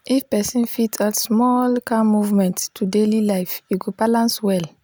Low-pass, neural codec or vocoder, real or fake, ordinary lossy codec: none; none; real; none